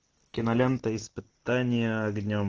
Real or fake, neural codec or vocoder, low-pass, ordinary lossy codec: real; none; 7.2 kHz; Opus, 16 kbps